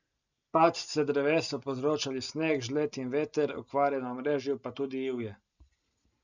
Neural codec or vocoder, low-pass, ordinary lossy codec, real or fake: none; 7.2 kHz; none; real